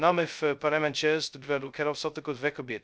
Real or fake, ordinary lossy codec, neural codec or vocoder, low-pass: fake; none; codec, 16 kHz, 0.2 kbps, FocalCodec; none